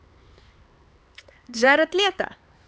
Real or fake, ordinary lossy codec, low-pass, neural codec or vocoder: fake; none; none; codec, 16 kHz, 4 kbps, X-Codec, HuBERT features, trained on LibriSpeech